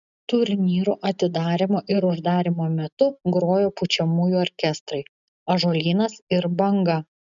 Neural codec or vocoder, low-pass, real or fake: none; 7.2 kHz; real